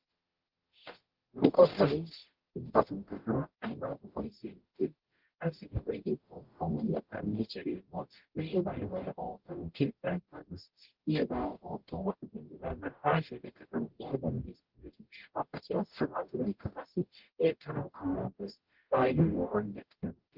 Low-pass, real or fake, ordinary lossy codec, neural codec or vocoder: 5.4 kHz; fake; Opus, 16 kbps; codec, 44.1 kHz, 0.9 kbps, DAC